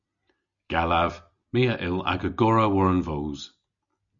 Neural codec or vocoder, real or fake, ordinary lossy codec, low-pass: none; real; MP3, 64 kbps; 7.2 kHz